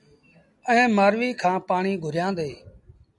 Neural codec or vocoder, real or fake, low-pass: none; real; 10.8 kHz